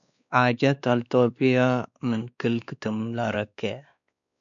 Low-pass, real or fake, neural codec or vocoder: 7.2 kHz; fake; codec, 16 kHz, 2 kbps, X-Codec, WavLM features, trained on Multilingual LibriSpeech